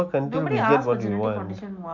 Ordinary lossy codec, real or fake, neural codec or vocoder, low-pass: none; real; none; 7.2 kHz